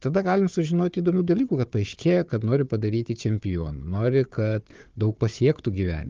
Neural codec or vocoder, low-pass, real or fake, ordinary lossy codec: codec, 16 kHz, 4 kbps, FunCodec, trained on Chinese and English, 50 frames a second; 7.2 kHz; fake; Opus, 32 kbps